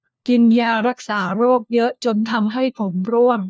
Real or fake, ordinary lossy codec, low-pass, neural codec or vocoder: fake; none; none; codec, 16 kHz, 1 kbps, FunCodec, trained on LibriTTS, 50 frames a second